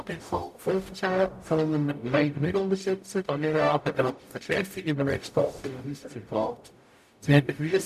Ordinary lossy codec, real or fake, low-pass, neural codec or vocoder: none; fake; 14.4 kHz; codec, 44.1 kHz, 0.9 kbps, DAC